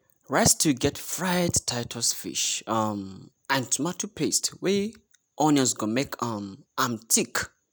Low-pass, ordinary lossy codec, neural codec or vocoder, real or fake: none; none; none; real